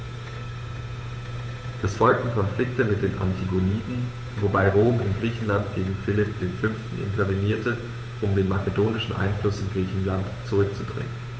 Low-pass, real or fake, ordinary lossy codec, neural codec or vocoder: none; fake; none; codec, 16 kHz, 8 kbps, FunCodec, trained on Chinese and English, 25 frames a second